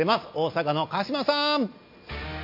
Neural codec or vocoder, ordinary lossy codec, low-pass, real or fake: none; MP3, 32 kbps; 5.4 kHz; real